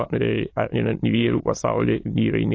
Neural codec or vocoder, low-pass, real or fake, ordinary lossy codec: autoencoder, 22.05 kHz, a latent of 192 numbers a frame, VITS, trained on many speakers; 7.2 kHz; fake; AAC, 32 kbps